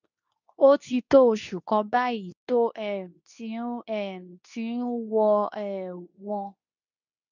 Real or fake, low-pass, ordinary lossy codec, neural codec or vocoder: fake; 7.2 kHz; none; codec, 24 kHz, 0.9 kbps, WavTokenizer, medium speech release version 2